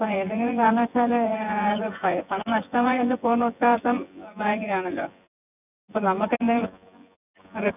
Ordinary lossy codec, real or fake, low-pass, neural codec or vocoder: none; fake; 3.6 kHz; vocoder, 24 kHz, 100 mel bands, Vocos